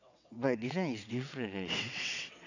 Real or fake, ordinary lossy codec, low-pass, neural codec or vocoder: fake; none; 7.2 kHz; vocoder, 22.05 kHz, 80 mel bands, WaveNeXt